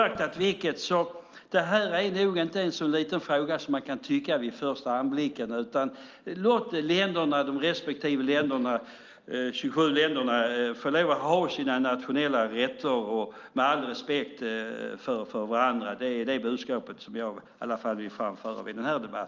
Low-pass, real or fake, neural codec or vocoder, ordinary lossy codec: 7.2 kHz; real; none; Opus, 24 kbps